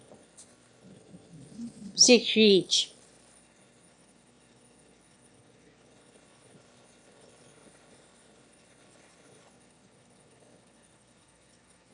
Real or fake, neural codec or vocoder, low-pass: fake; autoencoder, 22.05 kHz, a latent of 192 numbers a frame, VITS, trained on one speaker; 9.9 kHz